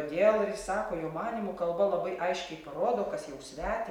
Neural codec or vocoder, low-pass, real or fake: none; 19.8 kHz; real